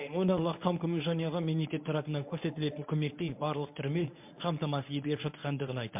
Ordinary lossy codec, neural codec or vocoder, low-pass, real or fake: MP3, 32 kbps; codec, 24 kHz, 0.9 kbps, WavTokenizer, medium speech release version 1; 3.6 kHz; fake